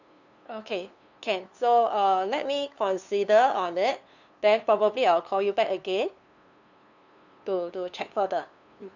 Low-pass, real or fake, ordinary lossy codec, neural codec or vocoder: 7.2 kHz; fake; none; codec, 16 kHz, 2 kbps, FunCodec, trained on LibriTTS, 25 frames a second